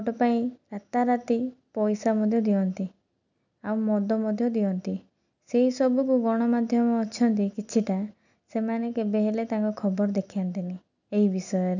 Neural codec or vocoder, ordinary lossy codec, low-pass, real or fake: none; none; 7.2 kHz; real